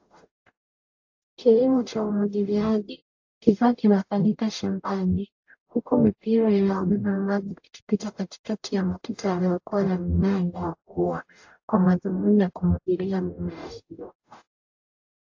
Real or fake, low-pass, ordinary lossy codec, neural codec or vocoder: fake; 7.2 kHz; AAC, 48 kbps; codec, 44.1 kHz, 0.9 kbps, DAC